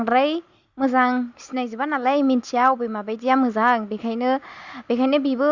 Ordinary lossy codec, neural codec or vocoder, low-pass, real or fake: Opus, 64 kbps; none; 7.2 kHz; real